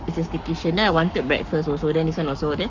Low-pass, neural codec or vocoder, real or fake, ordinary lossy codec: 7.2 kHz; codec, 44.1 kHz, 7.8 kbps, Pupu-Codec; fake; none